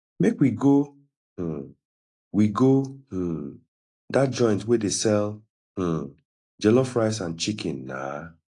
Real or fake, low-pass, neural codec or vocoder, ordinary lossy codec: real; 10.8 kHz; none; AAC, 48 kbps